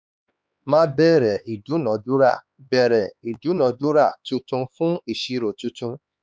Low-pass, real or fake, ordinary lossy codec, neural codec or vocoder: none; fake; none; codec, 16 kHz, 4 kbps, X-Codec, HuBERT features, trained on LibriSpeech